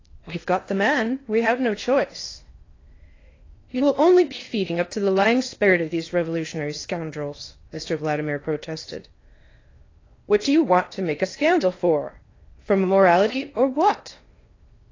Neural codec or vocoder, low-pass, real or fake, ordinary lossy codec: codec, 16 kHz in and 24 kHz out, 0.8 kbps, FocalCodec, streaming, 65536 codes; 7.2 kHz; fake; AAC, 32 kbps